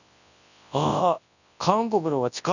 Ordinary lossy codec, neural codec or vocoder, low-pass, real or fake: none; codec, 24 kHz, 0.9 kbps, WavTokenizer, large speech release; 7.2 kHz; fake